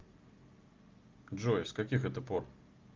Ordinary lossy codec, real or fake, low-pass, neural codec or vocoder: Opus, 24 kbps; real; 7.2 kHz; none